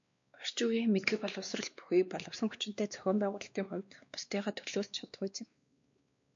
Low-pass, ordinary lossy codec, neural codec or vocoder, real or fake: 7.2 kHz; MP3, 48 kbps; codec, 16 kHz, 2 kbps, X-Codec, WavLM features, trained on Multilingual LibriSpeech; fake